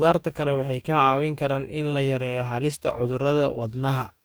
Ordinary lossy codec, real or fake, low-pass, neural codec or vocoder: none; fake; none; codec, 44.1 kHz, 2.6 kbps, DAC